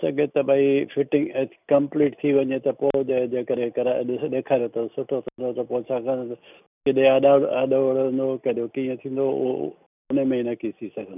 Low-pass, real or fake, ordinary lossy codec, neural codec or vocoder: 3.6 kHz; real; none; none